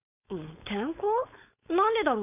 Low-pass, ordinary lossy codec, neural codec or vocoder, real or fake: 3.6 kHz; none; codec, 16 kHz, 4.8 kbps, FACodec; fake